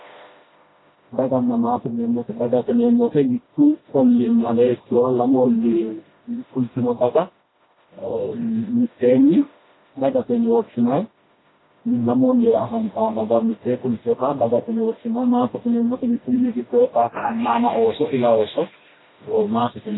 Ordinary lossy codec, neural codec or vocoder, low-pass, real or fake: AAC, 16 kbps; codec, 16 kHz, 1 kbps, FreqCodec, smaller model; 7.2 kHz; fake